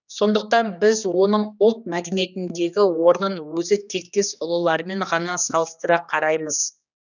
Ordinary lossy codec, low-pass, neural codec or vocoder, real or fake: none; 7.2 kHz; codec, 16 kHz, 2 kbps, X-Codec, HuBERT features, trained on general audio; fake